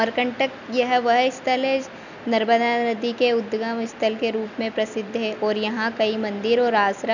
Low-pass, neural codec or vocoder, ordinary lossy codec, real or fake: 7.2 kHz; none; none; real